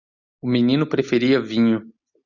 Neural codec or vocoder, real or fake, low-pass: none; real; 7.2 kHz